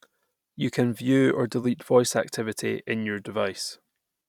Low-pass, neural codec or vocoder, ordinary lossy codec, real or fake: 19.8 kHz; vocoder, 44.1 kHz, 128 mel bands every 256 samples, BigVGAN v2; none; fake